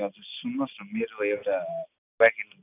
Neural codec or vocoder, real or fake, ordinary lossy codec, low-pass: none; real; none; 3.6 kHz